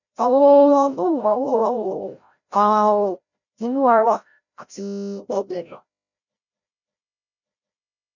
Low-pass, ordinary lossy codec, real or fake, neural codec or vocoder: 7.2 kHz; none; fake; codec, 16 kHz, 0.5 kbps, FreqCodec, larger model